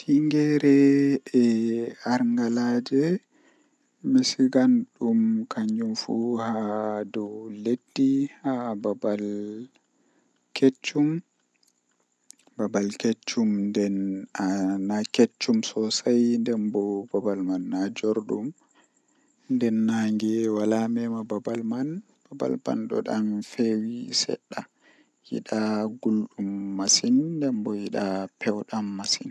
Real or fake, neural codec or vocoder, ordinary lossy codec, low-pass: real; none; none; none